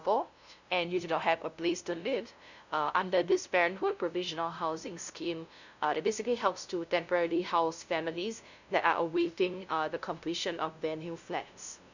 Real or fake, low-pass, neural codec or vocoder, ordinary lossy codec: fake; 7.2 kHz; codec, 16 kHz, 0.5 kbps, FunCodec, trained on LibriTTS, 25 frames a second; none